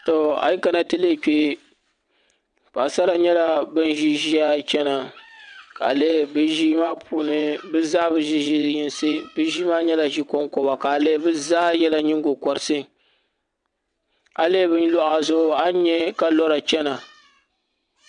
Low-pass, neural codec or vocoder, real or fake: 9.9 kHz; vocoder, 22.05 kHz, 80 mel bands, WaveNeXt; fake